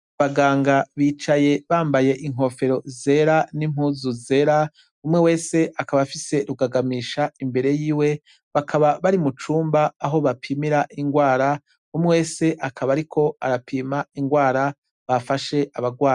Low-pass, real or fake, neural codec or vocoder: 10.8 kHz; real; none